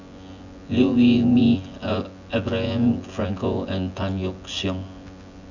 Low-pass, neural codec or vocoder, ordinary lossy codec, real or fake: 7.2 kHz; vocoder, 24 kHz, 100 mel bands, Vocos; none; fake